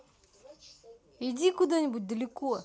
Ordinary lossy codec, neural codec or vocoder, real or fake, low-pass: none; none; real; none